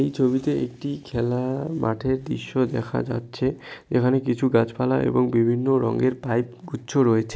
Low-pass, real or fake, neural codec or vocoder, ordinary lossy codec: none; real; none; none